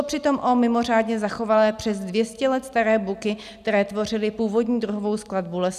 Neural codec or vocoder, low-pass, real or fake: none; 14.4 kHz; real